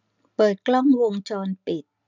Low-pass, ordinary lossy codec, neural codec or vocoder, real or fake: 7.2 kHz; none; none; real